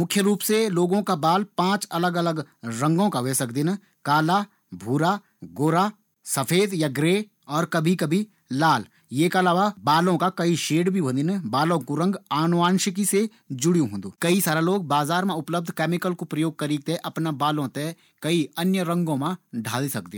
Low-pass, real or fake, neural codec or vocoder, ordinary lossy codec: 19.8 kHz; real; none; none